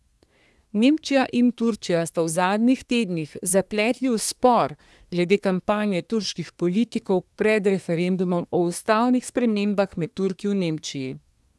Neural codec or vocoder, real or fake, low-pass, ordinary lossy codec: codec, 24 kHz, 1 kbps, SNAC; fake; none; none